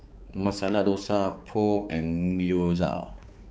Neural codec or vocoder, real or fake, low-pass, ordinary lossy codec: codec, 16 kHz, 4 kbps, X-Codec, HuBERT features, trained on balanced general audio; fake; none; none